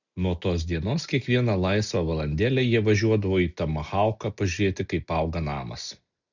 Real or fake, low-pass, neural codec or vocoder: real; 7.2 kHz; none